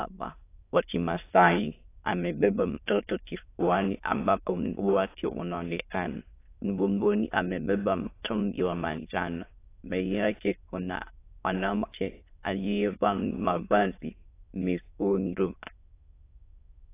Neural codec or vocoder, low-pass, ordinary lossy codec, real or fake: autoencoder, 22.05 kHz, a latent of 192 numbers a frame, VITS, trained on many speakers; 3.6 kHz; AAC, 24 kbps; fake